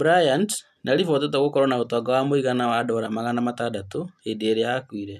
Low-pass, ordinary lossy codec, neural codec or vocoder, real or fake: 14.4 kHz; none; none; real